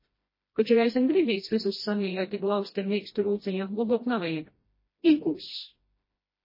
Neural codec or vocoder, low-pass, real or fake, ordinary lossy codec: codec, 16 kHz, 1 kbps, FreqCodec, smaller model; 5.4 kHz; fake; MP3, 24 kbps